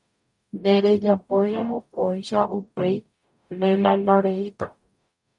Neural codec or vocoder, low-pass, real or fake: codec, 44.1 kHz, 0.9 kbps, DAC; 10.8 kHz; fake